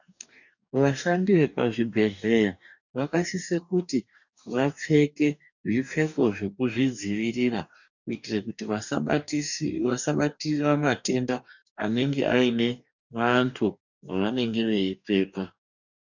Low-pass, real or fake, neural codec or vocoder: 7.2 kHz; fake; codec, 44.1 kHz, 2.6 kbps, DAC